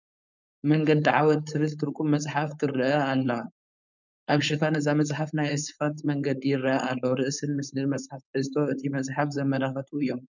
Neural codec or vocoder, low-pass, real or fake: codec, 16 kHz, 4.8 kbps, FACodec; 7.2 kHz; fake